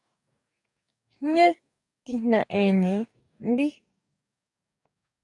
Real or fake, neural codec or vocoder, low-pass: fake; codec, 44.1 kHz, 2.6 kbps, DAC; 10.8 kHz